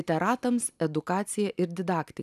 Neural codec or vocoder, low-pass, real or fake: none; 14.4 kHz; real